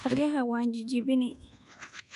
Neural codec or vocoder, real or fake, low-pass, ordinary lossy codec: codec, 24 kHz, 1.2 kbps, DualCodec; fake; 10.8 kHz; none